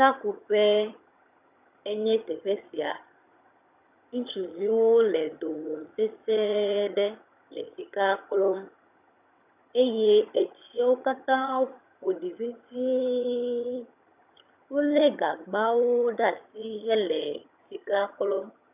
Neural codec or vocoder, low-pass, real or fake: vocoder, 22.05 kHz, 80 mel bands, HiFi-GAN; 3.6 kHz; fake